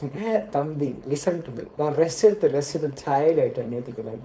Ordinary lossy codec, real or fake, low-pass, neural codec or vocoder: none; fake; none; codec, 16 kHz, 4.8 kbps, FACodec